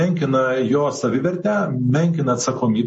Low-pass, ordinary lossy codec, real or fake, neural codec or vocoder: 10.8 kHz; MP3, 32 kbps; real; none